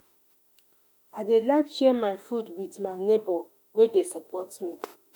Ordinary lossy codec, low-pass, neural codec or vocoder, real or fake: none; none; autoencoder, 48 kHz, 32 numbers a frame, DAC-VAE, trained on Japanese speech; fake